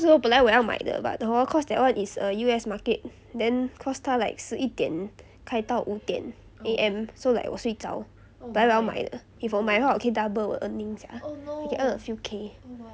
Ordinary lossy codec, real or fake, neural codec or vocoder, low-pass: none; real; none; none